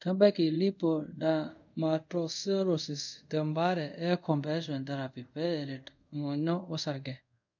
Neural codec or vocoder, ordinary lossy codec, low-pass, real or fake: codec, 24 kHz, 0.5 kbps, DualCodec; none; 7.2 kHz; fake